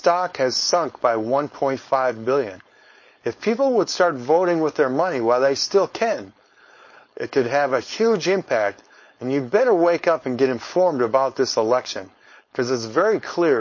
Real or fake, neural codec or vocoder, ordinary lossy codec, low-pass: fake; codec, 16 kHz, 4.8 kbps, FACodec; MP3, 32 kbps; 7.2 kHz